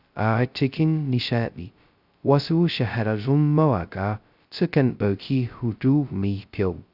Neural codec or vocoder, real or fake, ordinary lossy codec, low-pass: codec, 16 kHz, 0.2 kbps, FocalCodec; fake; Opus, 64 kbps; 5.4 kHz